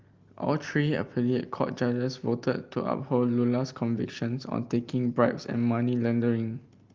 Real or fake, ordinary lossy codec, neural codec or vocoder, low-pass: real; Opus, 32 kbps; none; 7.2 kHz